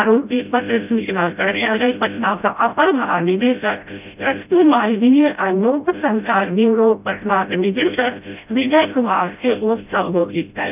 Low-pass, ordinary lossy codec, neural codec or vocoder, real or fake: 3.6 kHz; none; codec, 16 kHz, 0.5 kbps, FreqCodec, smaller model; fake